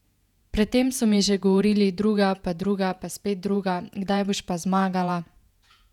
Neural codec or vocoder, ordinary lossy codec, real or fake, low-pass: vocoder, 48 kHz, 128 mel bands, Vocos; none; fake; 19.8 kHz